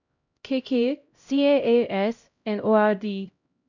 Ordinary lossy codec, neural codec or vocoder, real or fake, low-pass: none; codec, 16 kHz, 0.5 kbps, X-Codec, HuBERT features, trained on LibriSpeech; fake; 7.2 kHz